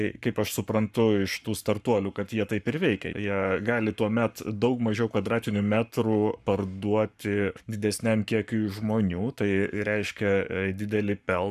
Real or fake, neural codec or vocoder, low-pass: fake; codec, 44.1 kHz, 7.8 kbps, Pupu-Codec; 14.4 kHz